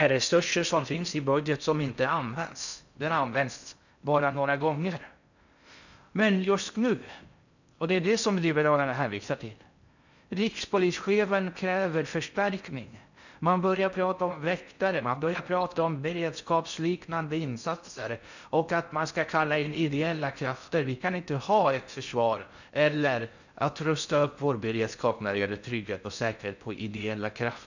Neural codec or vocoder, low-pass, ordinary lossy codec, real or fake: codec, 16 kHz in and 24 kHz out, 0.6 kbps, FocalCodec, streaming, 4096 codes; 7.2 kHz; none; fake